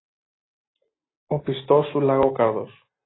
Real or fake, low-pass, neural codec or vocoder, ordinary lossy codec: real; 7.2 kHz; none; AAC, 16 kbps